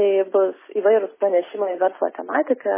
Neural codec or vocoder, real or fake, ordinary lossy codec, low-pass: none; real; MP3, 16 kbps; 3.6 kHz